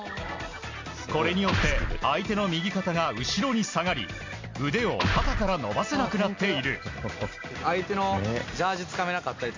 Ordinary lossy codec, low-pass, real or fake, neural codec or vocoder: MP3, 48 kbps; 7.2 kHz; real; none